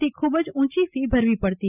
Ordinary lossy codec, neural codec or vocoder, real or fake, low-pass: none; none; real; 3.6 kHz